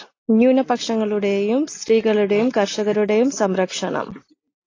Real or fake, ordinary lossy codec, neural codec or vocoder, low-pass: real; AAC, 32 kbps; none; 7.2 kHz